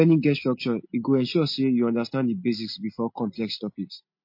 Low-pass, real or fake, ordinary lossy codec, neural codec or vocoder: 5.4 kHz; real; MP3, 32 kbps; none